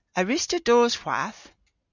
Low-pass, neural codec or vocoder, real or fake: 7.2 kHz; none; real